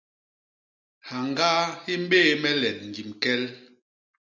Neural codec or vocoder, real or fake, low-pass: none; real; 7.2 kHz